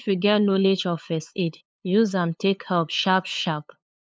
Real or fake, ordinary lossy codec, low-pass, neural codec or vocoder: fake; none; none; codec, 16 kHz, 8 kbps, FunCodec, trained on LibriTTS, 25 frames a second